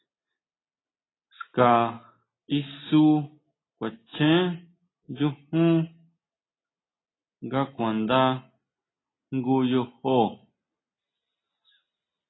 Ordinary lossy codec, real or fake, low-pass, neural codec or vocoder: AAC, 16 kbps; real; 7.2 kHz; none